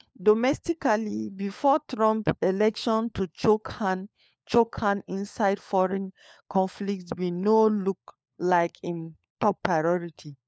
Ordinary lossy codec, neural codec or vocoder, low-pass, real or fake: none; codec, 16 kHz, 4 kbps, FunCodec, trained on LibriTTS, 50 frames a second; none; fake